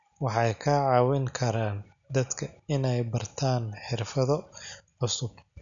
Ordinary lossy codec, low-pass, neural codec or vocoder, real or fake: none; 7.2 kHz; none; real